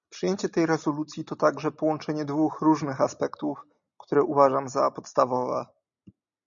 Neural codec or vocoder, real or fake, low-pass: none; real; 7.2 kHz